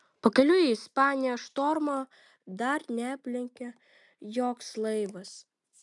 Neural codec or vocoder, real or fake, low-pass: none; real; 10.8 kHz